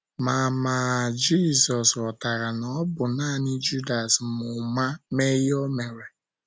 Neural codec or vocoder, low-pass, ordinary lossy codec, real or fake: none; none; none; real